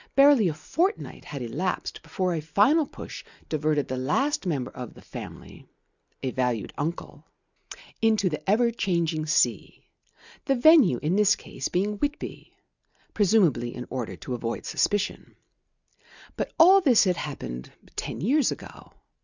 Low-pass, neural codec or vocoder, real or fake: 7.2 kHz; none; real